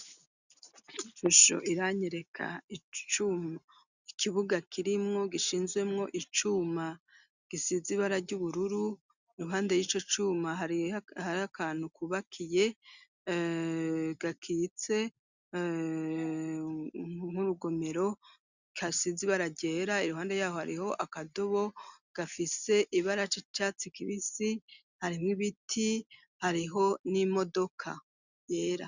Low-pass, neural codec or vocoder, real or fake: 7.2 kHz; none; real